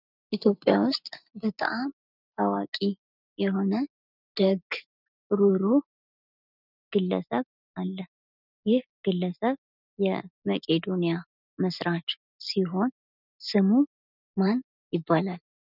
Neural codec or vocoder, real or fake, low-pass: none; real; 5.4 kHz